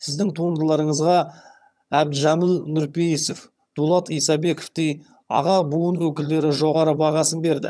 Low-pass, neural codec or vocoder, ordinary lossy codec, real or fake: none; vocoder, 22.05 kHz, 80 mel bands, HiFi-GAN; none; fake